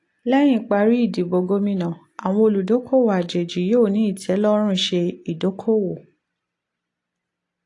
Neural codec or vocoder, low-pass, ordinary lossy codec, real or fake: none; 10.8 kHz; AAC, 48 kbps; real